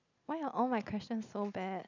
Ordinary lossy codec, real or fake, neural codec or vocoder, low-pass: none; real; none; 7.2 kHz